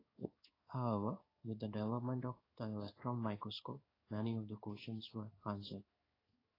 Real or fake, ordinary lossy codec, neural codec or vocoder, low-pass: fake; AAC, 24 kbps; codec, 16 kHz in and 24 kHz out, 1 kbps, XY-Tokenizer; 5.4 kHz